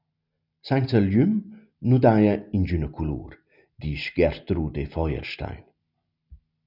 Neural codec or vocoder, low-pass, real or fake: none; 5.4 kHz; real